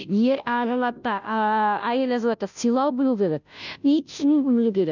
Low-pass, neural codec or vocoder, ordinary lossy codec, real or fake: 7.2 kHz; codec, 16 kHz, 0.5 kbps, FunCodec, trained on Chinese and English, 25 frames a second; none; fake